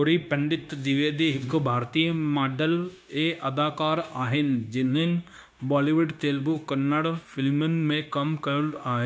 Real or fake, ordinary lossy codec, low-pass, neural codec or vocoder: fake; none; none; codec, 16 kHz, 0.9 kbps, LongCat-Audio-Codec